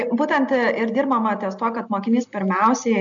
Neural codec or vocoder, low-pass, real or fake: none; 7.2 kHz; real